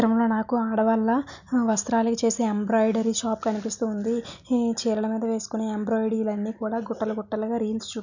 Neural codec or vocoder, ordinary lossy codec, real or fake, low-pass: none; none; real; 7.2 kHz